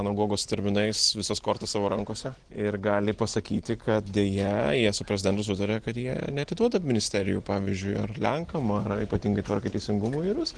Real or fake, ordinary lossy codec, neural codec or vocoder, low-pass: real; Opus, 16 kbps; none; 9.9 kHz